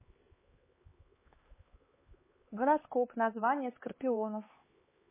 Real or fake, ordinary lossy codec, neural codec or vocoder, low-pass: fake; MP3, 16 kbps; codec, 16 kHz, 4 kbps, X-Codec, HuBERT features, trained on LibriSpeech; 3.6 kHz